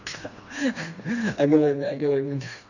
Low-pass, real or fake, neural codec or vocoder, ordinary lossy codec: 7.2 kHz; fake; codec, 16 kHz, 2 kbps, FreqCodec, smaller model; none